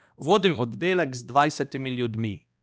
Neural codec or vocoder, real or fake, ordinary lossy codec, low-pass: codec, 16 kHz, 1 kbps, X-Codec, HuBERT features, trained on balanced general audio; fake; none; none